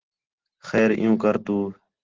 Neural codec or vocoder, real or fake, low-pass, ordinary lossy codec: none; real; 7.2 kHz; Opus, 16 kbps